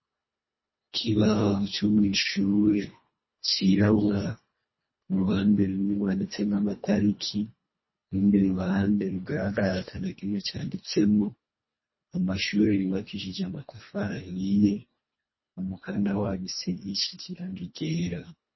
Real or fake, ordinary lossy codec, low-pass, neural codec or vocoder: fake; MP3, 24 kbps; 7.2 kHz; codec, 24 kHz, 1.5 kbps, HILCodec